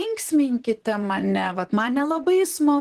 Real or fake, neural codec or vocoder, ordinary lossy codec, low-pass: fake; vocoder, 44.1 kHz, 128 mel bands, Pupu-Vocoder; Opus, 16 kbps; 14.4 kHz